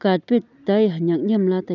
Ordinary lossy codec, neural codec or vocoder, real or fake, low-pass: none; none; real; 7.2 kHz